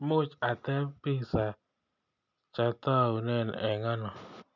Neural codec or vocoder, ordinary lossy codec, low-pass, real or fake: none; none; 7.2 kHz; real